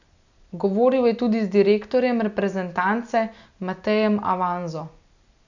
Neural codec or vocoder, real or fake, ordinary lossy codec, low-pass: none; real; none; 7.2 kHz